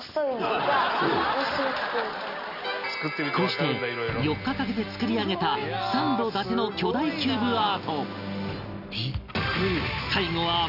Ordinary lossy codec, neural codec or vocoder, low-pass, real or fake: none; none; 5.4 kHz; real